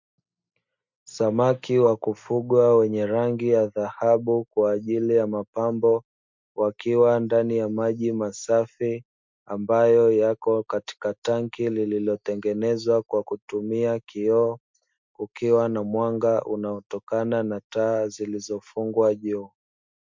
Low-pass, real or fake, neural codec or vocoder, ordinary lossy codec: 7.2 kHz; real; none; MP3, 64 kbps